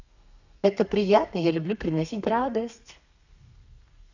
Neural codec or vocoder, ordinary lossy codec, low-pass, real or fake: codec, 32 kHz, 1.9 kbps, SNAC; none; 7.2 kHz; fake